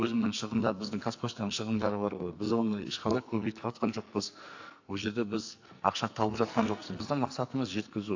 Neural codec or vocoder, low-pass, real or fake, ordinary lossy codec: codec, 44.1 kHz, 2.6 kbps, SNAC; 7.2 kHz; fake; AAC, 48 kbps